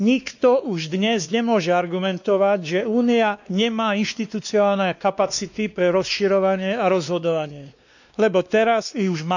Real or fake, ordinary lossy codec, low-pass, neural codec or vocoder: fake; none; 7.2 kHz; codec, 16 kHz, 2 kbps, X-Codec, WavLM features, trained on Multilingual LibriSpeech